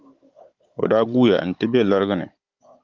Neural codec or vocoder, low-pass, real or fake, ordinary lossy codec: codec, 16 kHz, 16 kbps, FunCodec, trained on Chinese and English, 50 frames a second; 7.2 kHz; fake; Opus, 32 kbps